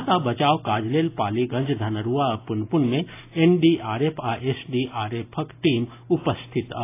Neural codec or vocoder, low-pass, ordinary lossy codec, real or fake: none; 3.6 kHz; AAC, 24 kbps; real